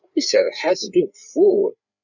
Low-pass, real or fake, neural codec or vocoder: 7.2 kHz; fake; codec, 16 kHz, 8 kbps, FreqCodec, larger model